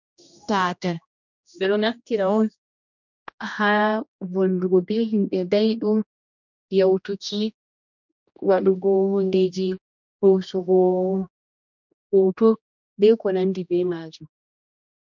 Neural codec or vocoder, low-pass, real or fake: codec, 16 kHz, 1 kbps, X-Codec, HuBERT features, trained on general audio; 7.2 kHz; fake